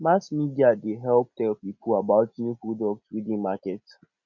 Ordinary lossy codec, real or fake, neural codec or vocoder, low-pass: none; real; none; 7.2 kHz